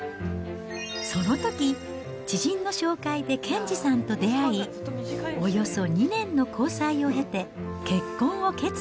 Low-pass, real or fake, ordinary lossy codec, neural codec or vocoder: none; real; none; none